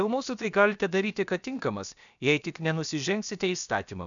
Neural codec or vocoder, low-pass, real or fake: codec, 16 kHz, about 1 kbps, DyCAST, with the encoder's durations; 7.2 kHz; fake